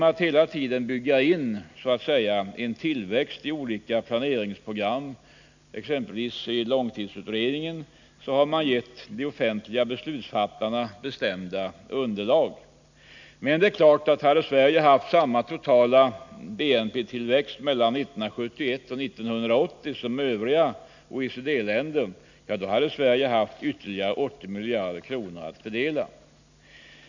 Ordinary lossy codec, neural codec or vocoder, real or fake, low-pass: none; none; real; 7.2 kHz